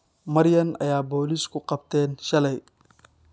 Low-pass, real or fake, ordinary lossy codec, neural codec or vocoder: none; real; none; none